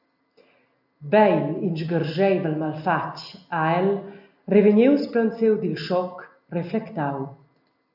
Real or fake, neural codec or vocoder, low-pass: real; none; 5.4 kHz